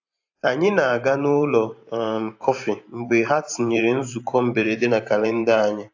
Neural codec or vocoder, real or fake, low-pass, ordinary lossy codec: vocoder, 44.1 kHz, 128 mel bands every 512 samples, BigVGAN v2; fake; 7.2 kHz; AAC, 48 kbps